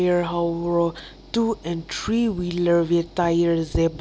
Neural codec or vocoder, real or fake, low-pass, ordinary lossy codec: none; real; none; none